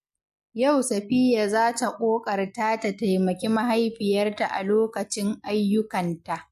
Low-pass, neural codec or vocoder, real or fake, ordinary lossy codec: 14.4 kHz; none; real; MP3, 64 kbps